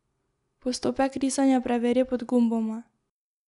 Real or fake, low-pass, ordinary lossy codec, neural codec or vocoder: real; 10.8 kHz; none; none